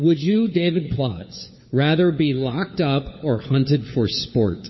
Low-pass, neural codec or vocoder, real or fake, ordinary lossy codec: 7.2 kHz; codec, 16 kHz, 4 kbps, FunCodec, trained on LibriTTS, 50 frames a second; fake; MP3, 24 kbps